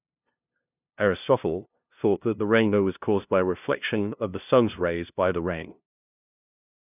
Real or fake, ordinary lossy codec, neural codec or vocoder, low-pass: fake; none; codec, 16 kHz, 0.5 kbps, FunCodec, trained on LibriTTS, 25 frames a second; 3.6 kHz